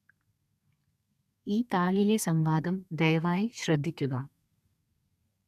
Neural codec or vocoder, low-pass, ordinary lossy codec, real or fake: codec, 32 kHz, 1.9 kbps, SNAC; 14.4 kHz; none; fake